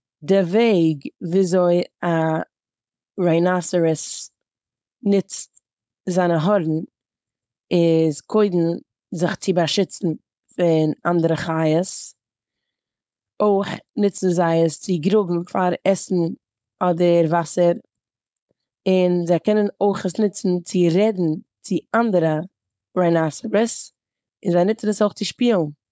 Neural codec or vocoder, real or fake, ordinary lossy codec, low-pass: codec, 16 kHz, 4.8 kbps, FACodec; fake; none; none